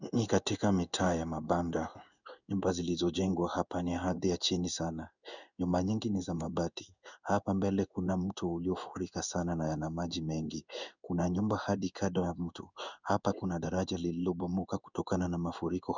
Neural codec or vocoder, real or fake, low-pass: codec, 16 kHz in and 24 kHz out, 1 kbps, XY-Tokenizer; fake; 7.2 kHz